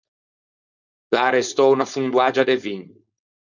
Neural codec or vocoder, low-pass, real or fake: codec, 16 kHz, 4.8 kbps, FACodec; 7.2 kHz; fake